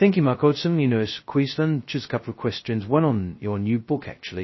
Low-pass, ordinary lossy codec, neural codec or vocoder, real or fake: 7.2 kHz; MP3, 24 kbps; codec, 16 kHz, 0.2 kbps, FocalCodec; fake